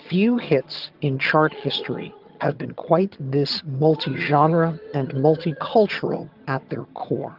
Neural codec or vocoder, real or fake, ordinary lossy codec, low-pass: vocoder, 22.05 kHz, 80 mel bands, HiFi-GAN; fake; Opus, 32 kbps; 5.4 kHz